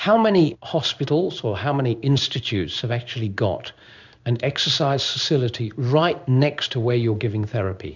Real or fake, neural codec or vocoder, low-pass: fake; codec, 16 kHz in and 24 kHz out, 1 kbps, XY-Tokenizer; 7.2 kHz